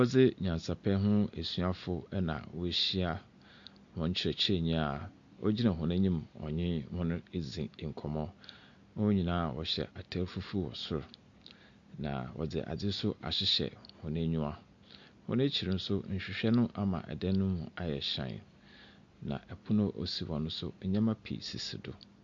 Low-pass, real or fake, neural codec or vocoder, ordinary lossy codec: 7.2 kHz; real; none; MP3, 48 kbps